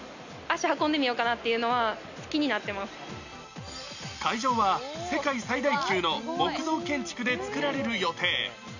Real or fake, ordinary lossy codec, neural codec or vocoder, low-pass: real; none; none; 7.2 kHz